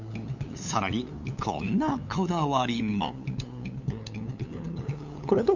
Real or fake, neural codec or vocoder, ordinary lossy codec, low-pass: fake; codec, 16 kHz, 8 kbps, FunCodec, trained on LibriTTS, 25 frames a second; none; 7.2 kHz